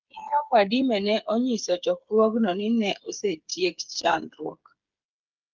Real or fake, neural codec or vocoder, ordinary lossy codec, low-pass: fake; codec, 16 kHz, 8 kbps, FreqCodec, smaller model; Opus, 24 kbps; 7.2 kHz